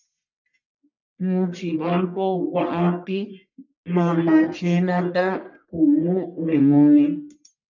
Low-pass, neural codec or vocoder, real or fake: 7.2 kHz; codec, 44.1 kHz, 1.7 kbps, Pupu-Codec; fake